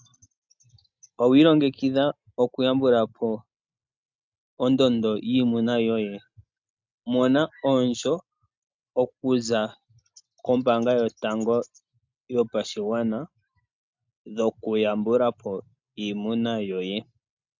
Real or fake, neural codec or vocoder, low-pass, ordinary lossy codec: real; none; 7.2 kHz; MP3, 64 kbps